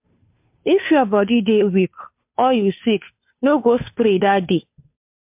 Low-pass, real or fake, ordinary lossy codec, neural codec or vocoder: 3.6 kHz; fake; MP3, 32 kbps; codec, 16 kHz, 2 kbps, FunCodec, trained on Chinese and English, 25 frames a second